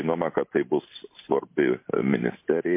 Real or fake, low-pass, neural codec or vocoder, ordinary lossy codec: fake; 3.6 kHz; vocoder, 44.1 kHz, 128 mel bands every 512 samples, BigVGAN v2; MP3, 24 kbps